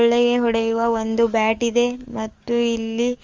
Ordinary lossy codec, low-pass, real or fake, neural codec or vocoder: Opus, 32 kbps; 7.2 kHz; fake; codec, 44.1 kHz, 7.8 kbps, DAC